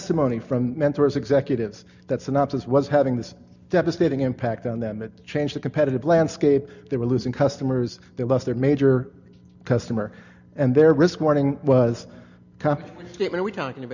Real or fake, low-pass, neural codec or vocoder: real; 7.2 kHz; none